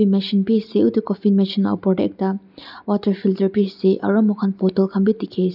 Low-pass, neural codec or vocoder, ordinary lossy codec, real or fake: 5.4 kHz; none; none; real